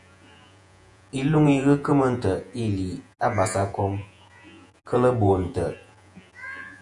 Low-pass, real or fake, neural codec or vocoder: 10.8 kHz; fake; vocoder, 48 kHz, 128 mel bands, Vocos